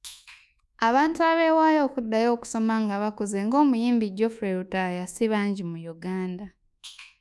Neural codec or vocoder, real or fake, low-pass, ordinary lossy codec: codec, 24 kHz, 1.2 kbps, DualCodec; fake; none; none